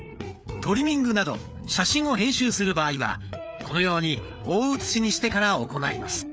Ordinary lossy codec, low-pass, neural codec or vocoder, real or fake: none; none; codec, 16 kHz, 4 kbps, FreqCodec, larger model; fake